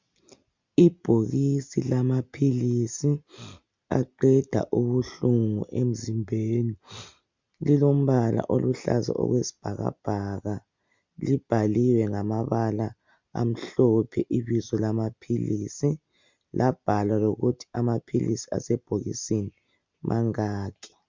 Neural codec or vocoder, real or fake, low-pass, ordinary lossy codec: none; real; 7.2 kHz; MP3, 64 kbps